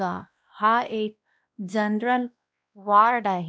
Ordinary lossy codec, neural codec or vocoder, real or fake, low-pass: none; codec, 16 kHz, 1 kbps, X-Codec, WavLM features, trained on Multilingual LibriSpeech; fake; none